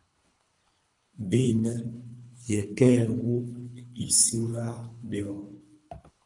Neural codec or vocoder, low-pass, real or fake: codec, 24 kHz, 3 kbps, HILCodec; 10.8 kHz; fake